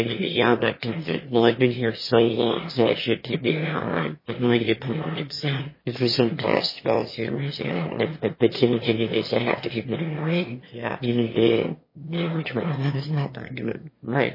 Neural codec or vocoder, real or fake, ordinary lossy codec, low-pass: autoencoder, 22.05 kHz, a latent of 192 numbers a frame, VITS, trained on one speaker; fake; MP3, 24 kbps; 5.4 kHz